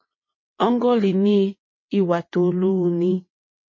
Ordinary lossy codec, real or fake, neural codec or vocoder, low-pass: MP3, 32 kbps; fake; vocoder, 22.05 kHz, 80 mel bands, WaveNeXt; 7.2 kHz